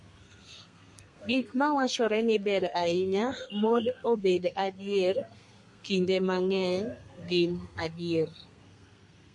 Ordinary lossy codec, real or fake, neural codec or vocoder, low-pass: MP3, 48 kbps; fake; codec, 32 kHz, 1.9 kbps, SNAC; 10.8 kHz